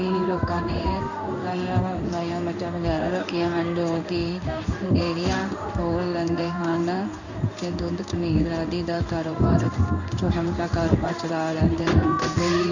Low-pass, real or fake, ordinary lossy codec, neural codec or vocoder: 7.2 kHz; fake; none; codec, 16 kHz in and 24 kHz out, 1 kbps, XY-Tokenizer